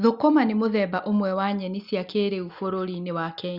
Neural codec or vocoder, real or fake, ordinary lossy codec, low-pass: none; real; Opus, 64 kbps; 5.4 kHz